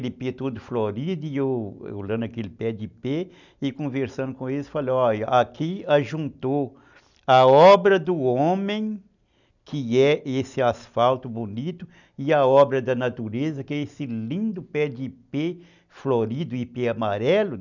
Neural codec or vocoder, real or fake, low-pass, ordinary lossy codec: none; real; 7.2 kHz; none